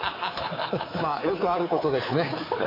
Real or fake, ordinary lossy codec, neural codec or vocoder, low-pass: fake; AAC, 24 kbps; codec, 24 kHz, 3.1 kbps, DualCodec; 5.4 kHz